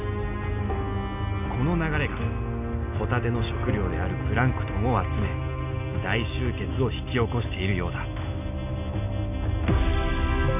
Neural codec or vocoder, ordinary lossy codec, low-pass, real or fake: none; none; 3.6 kHz; real